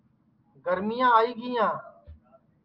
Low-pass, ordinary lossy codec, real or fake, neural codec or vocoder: 5.4 kHz; Opus, 32 kbps; real; none